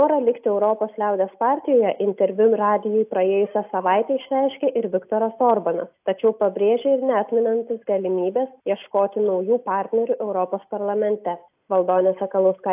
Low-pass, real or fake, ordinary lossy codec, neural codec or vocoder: 3.6 kHz; real; AAC, 32 kbps; none